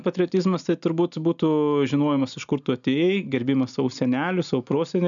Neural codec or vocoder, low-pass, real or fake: none; 7.2 kHz; real